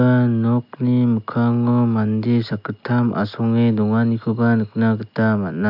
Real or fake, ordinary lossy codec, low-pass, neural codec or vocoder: real; AAC, 48 kbps; 5.4 kHz; none